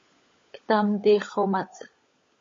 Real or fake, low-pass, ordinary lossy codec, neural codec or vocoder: fake; 7.2 kHz; MP3, 32 kbps; codec, 16 kHz, 16 kbps, FunCodec, trained on LibriTTS, 50 frames a second